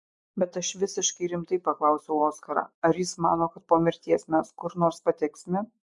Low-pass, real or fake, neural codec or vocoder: 10.8 kHz; fake; vocoder, 24 kHz, 100 mel bands, Vocos